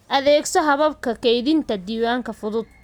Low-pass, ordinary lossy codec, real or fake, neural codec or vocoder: 19.8 kHz; none; real; none